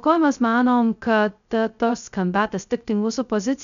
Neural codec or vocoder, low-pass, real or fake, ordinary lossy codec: codec, 16 kHz, 0.2 kbps, FocalCodec; 7.2 kHz; fake; MP3, 96 kbps